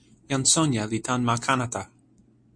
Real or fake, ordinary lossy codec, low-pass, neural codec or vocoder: real; MP3, 48 kbps; 9.9 kHz; none